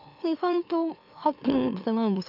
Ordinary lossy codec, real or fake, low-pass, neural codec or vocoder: none; fake; 5.4 kHz; autoencoder, 44.1 kHz, a latent of 192 numbers a frame, MeloTTS